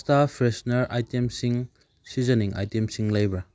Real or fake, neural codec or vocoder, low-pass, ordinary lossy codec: real; none; none; none